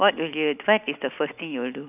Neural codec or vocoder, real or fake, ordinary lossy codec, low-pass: none; real; none; 3.6 kHz